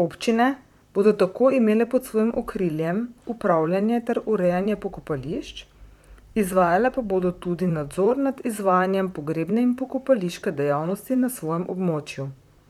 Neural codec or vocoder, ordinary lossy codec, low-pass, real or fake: vocoder, 44.1 kHz, 128 mel bands, Pupu-Vocoder; none; 19.8 kHz; fake